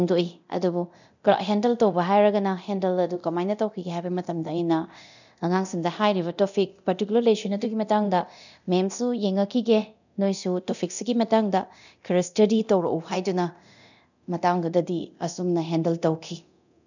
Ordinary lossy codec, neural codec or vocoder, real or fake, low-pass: none; codec, 24 kHz, 0.9 kbps, DualCodec; fake; 7.2 kHz